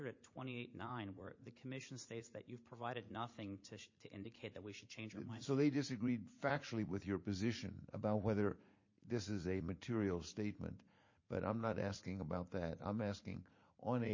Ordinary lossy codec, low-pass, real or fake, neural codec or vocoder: MP3, 32 kbps; 7.2 kHz; fake; vocoder, 44.1 kHz, 80 mel bands, Vocos